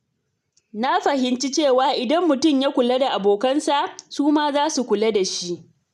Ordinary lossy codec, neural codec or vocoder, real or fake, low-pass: none; none; real; 14.4 kHz